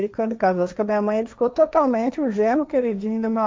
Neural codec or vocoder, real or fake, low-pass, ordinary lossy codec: codec, 16 kHz, 1.1 kbps, Voila-Tokenizer; fake; none; none